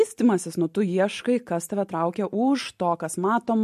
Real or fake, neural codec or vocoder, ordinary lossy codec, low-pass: real; none; MP3, 64 kbps; 14.4 kHz